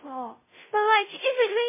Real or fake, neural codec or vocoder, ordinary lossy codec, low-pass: fake; codec, 16 kHz, 0.5 kbps, FunCodec, trained on Chinese and English, 25 frames a second; MP3, 16 kbps; 3.6 kHz